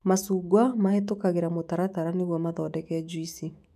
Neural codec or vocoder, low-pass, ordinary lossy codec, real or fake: autoencoder, 48 kHz, 128 numbers a frame, DAC-VAE, trained on Japanese speech; 14.4 kHz; none; fake